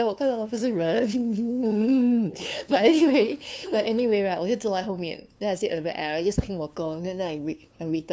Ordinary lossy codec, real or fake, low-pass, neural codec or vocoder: none; fake; none; codec, 16 kHz, 2 kbps, FunCodec, trained on LibriTTS, 25 frames a second